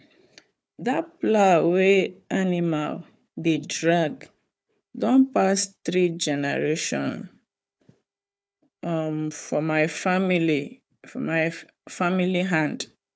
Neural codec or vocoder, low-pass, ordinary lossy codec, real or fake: codec, 16 kHz, 4 kbps, FunCodec, trained on Chinese and English, 50 frames a second; none; none; fake